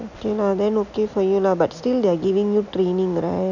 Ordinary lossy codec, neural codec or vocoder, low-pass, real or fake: none; none; 7.2 kHz; real